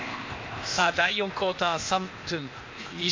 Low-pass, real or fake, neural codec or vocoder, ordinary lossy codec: 7.2 kHz; fake; codec, 16 kHz, 0.8 kbps, ZipCodec; MP3, 48 kbps